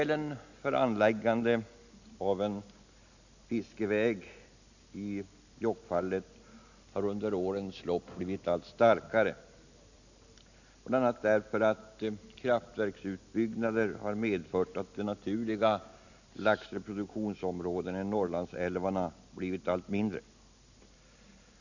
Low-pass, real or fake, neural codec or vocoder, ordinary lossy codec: 7.2 kHz; real; none; none